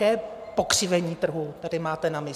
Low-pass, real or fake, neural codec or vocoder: 14.4 kHz; fake; vocoder, 44.1 kHz, 128 mel bands every 512 samples, BigVGAN v2